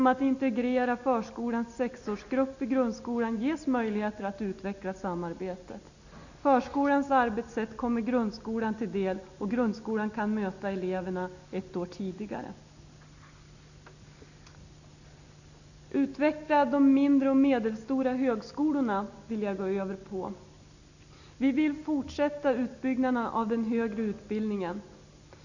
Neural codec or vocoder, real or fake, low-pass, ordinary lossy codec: none; real; 7.2 kHz; none